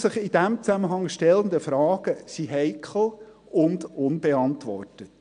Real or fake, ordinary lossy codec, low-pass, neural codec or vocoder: real; MP3, 64 kbps; 9.9 kHz; none